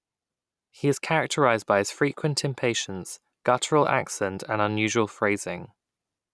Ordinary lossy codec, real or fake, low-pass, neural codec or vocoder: none; real; none; none